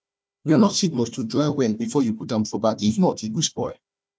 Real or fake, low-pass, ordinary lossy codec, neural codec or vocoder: fake; none; none; codec, 16 kHz, 1 kbps, FunCodec, trained on Chinese and English, 50 frames a second